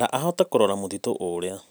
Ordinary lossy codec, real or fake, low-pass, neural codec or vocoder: none; real; none; none